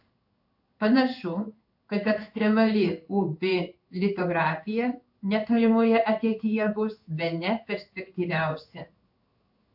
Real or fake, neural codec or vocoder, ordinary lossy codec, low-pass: fake; codec, 16 kHz in and 24 kHz out, 1 kbps, XY-Tokenizer; AAC, 48 kbps; 5.4 kHz